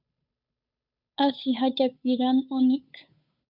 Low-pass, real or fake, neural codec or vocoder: 5.4 kHz; fake; codec, 16 kHz, 8 kbps, FunCodec, trained on Chinese and English, 25 frames a second